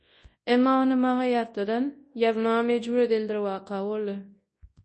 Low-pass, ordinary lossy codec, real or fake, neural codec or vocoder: 10.8 kHz; MP3, 32 kbps; fake; codec, 24 kHz, 0.9 kbps, WavTokenizer, large speech release